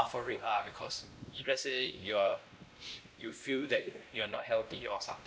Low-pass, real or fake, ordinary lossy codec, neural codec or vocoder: none; fake; none; codec, 16 kHz, 1 kbps, X-Codec, WavLM features, trained on Multilingual LibriSpeech